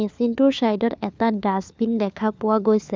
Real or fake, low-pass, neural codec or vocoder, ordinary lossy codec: fake; none; codec, 16 kHz, 4 kbps, FunCodec, trained on LibriTTS, 50 frames a second; none